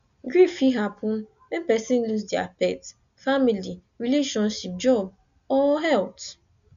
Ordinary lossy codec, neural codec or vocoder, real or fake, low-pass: none; none; real; 7.2 kHz